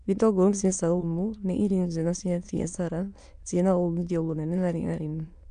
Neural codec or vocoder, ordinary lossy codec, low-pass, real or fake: autoencoder, 22.05 kHz, a latent of 192 numbers a frame, VITS, trained on many speakers; none; 9.9 kHz; fake